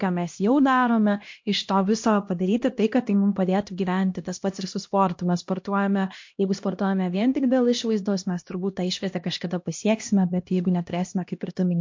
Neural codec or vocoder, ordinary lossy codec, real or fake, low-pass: codec, 16 kHz, 1 kbps, X-Codec, HuBERT features, trained on LibriSpeech; MP3, 48 kbps; fake; 7.2 kHz